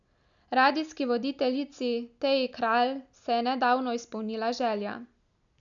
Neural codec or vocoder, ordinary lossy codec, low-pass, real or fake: none; none; 7.2 kHz; real